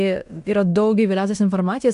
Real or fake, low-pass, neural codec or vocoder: fake; 10.8 kHz; codec, 16 kHz in and 24 kHz out, 0.9 kbps, LongCat-Audio-Codec, fine tuned four codebook decoder